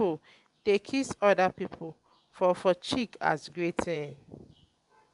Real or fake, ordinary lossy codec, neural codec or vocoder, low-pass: fake; none; vocoder, 24 kHz, 100 mel bands, Vocos; 10.8 kHz